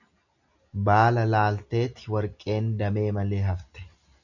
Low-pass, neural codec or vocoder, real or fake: 7.2 kHz; none; real